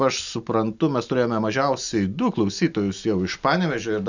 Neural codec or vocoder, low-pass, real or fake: none; 7.2 kHz; real